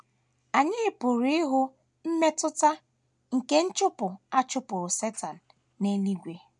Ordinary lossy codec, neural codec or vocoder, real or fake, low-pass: none; none; real; 10.8 kHz